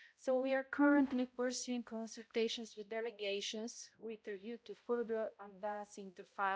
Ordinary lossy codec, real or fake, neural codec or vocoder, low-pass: none; fake; codec, 16 kHz, 0.5 kbps, X-Codec, HuBERT features, trained on balanced general audio; none